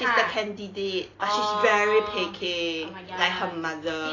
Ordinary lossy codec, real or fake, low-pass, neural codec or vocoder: AAC, 32 kbps; real; 7.2 kHz; none